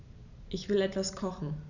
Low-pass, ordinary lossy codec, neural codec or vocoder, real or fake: 7.2 kHz; none; none; real